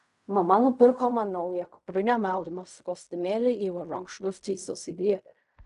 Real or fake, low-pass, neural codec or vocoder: fake; 10.8 kHz; codec, 16 kHz in and 24 kHz out, 0.4 kbps, LongCat-Audio-Codec, fine tuned four codebook decoder